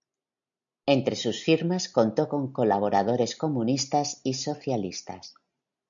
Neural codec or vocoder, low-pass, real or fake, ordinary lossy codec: none; 7.2 kHz; real; MP3, 96 kbps